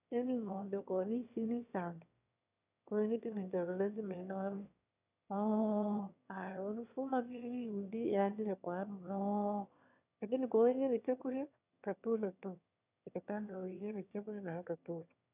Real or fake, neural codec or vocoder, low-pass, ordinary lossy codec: fake; autoencoder, 22.05 kHz, a latent of 192 numbers a frame, VITS, trained on one speaker; 3.6 kHz; none